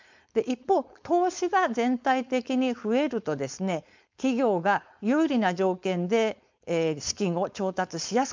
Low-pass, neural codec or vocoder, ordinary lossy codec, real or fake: 7.2 kHz; codec, 16 kHz, 4.8 kbps, FACodec; MP3, 64 kbps; fake